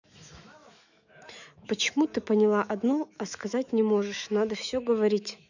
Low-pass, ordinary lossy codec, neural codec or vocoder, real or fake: 7.2 kHz; none; autoencoder, 48 kHz, 128 numbers a frame, DAC-VAE, trained on Japanese speech; fake